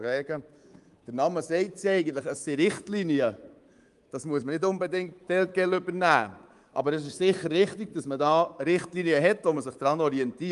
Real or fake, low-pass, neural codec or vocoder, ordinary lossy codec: fake; 10.8 kHz; codec, 24 kHz, 3.1 kbps, DualCodec; Opus, 32 kbps